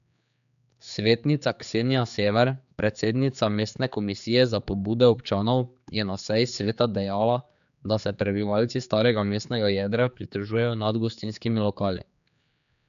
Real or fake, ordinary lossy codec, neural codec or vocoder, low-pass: fake; none; codec, 16 kHz, 4 kbps, X-Codec, HuBERT features, trained on general audio; 7.2 kHz